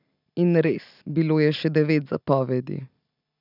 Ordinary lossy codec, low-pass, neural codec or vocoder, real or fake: none; 5.4 kHz; none; real